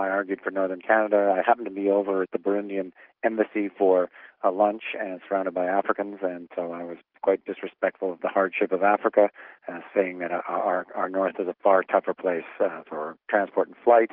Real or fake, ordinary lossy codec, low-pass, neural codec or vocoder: real; Opus, 24 kbps; 5.4 kHz; none